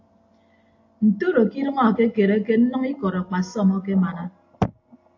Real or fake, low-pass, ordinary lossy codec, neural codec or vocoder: real; 7.2 kHz; AAC, 48 kbps; none